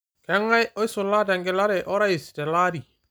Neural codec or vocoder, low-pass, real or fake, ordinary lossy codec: none; none; real; none